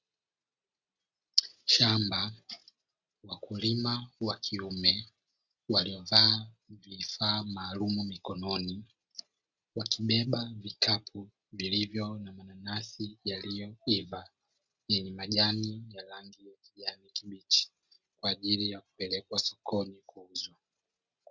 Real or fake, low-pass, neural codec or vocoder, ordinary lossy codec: real; 7.2 kHz; none; Opus, 64 kbps